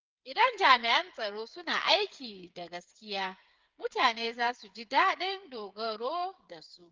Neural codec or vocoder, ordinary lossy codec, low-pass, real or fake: codec, 16 kHz, 8 kbps, FreqCodec, smaller model; Opus, 32 kbps; 7.2 kHz; fake